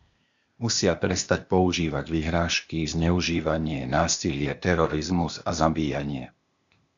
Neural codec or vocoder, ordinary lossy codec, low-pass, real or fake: codec, 16 kHz, 0.8 kbps, ZipCodec; MP3, 64 kbps; 7.2 kHz; fake